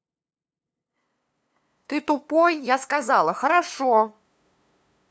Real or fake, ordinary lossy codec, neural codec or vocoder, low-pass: fake; none; codec, 16 kHz, 2 kbps, FunCodec, trained on LibriTTS, 25 frames a second; none